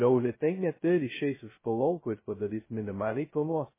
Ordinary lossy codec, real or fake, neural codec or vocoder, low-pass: MP3, 16 kbps; fake; codec, 16 kHz, 0.2 kbps, FocalCodec; 3.6 kHz